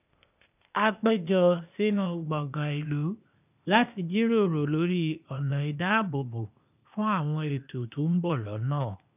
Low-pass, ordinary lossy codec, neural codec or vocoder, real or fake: 3.6 kHz; none; codec, 16 kHz, 0.8 kbps, ZipCodec; fake